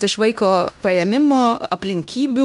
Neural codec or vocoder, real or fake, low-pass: codec, 16 kHz in and 24 kHz out, 0.9 kbps, LongCat-Audio-Codec, fine tuned four codebook decoder; fake; 10.8 kHz